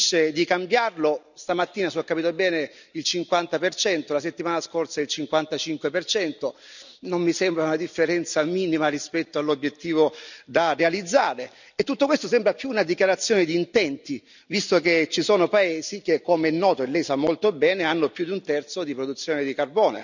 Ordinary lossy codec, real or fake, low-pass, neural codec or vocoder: none; real; 7.2 kHz; none